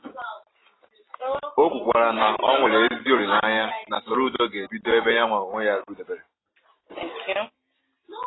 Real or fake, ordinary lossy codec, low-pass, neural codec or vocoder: real; AAC, 16 kbps; 7.2 kHz; none